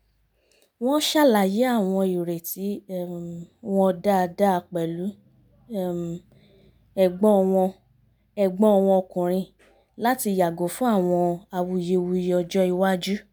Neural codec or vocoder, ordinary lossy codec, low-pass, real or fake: none; none; none; real